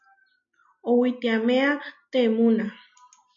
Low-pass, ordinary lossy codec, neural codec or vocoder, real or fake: 7.2 kHz; MP3, 48 kbps; none; real